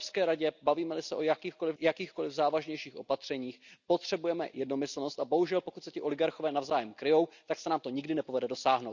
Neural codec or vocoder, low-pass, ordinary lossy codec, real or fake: none; 7.2 kHz; none; real